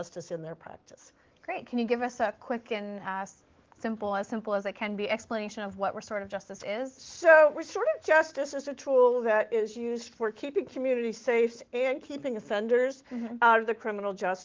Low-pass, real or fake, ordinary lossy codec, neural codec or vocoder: 7.2 kHz; fake; Opus, 16 kbps; autoencoder, 48 kHz, 128 numbers a frame, DAC-VAE, trained on Japanese speech